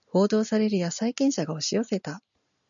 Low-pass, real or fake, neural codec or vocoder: 7.2 kHz; real; none